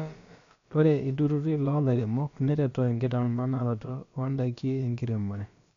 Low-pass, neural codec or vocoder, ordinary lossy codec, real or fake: 7.2 kHz; codec, 16 kHz, about 1 kbps, DyCAST, with the encoder's durations; MP3, 64 kbps; fake